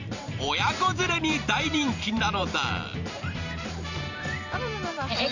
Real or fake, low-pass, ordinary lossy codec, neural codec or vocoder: fake; 7.2 kHz; none; vocoder, 44.1 kHz, 128 mel bands every 512 samples, BigVGAN v2